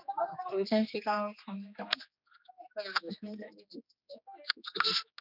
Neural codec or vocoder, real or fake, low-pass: codec, 16 kHz, 2 kbps, X-Codec, HuBERT features, trained on general audio; fake; 5.4 kHz